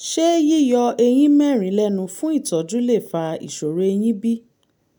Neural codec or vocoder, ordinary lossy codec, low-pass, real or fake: none; none; 19.8 kHz; real